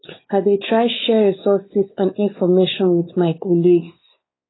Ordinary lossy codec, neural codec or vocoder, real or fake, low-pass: AAC, 16 kbps; codec, 16 kHz, 4 kbps, X-Codec, WavLM features, trained on Multilingual LibriSpeech; fake; 7.2 kHz